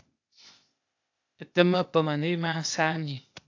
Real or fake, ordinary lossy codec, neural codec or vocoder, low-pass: fake; AAC, 48 kbps; codec, 16 kHz, 0.8 kbps, ZipCodec; 7.2 kHz